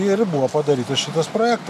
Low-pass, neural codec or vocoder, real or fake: 14.4 kHz; none; real